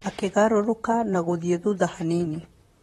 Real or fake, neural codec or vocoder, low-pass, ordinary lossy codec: fake; vocoder, 44.1 kHz, 128 mel bands, Pupu-Vocoder; 19.8 kHz; AAC, 32 kbps